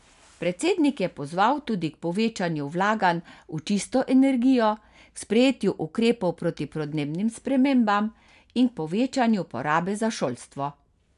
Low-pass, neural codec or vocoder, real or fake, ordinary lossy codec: 10.8 kHz; none; real; none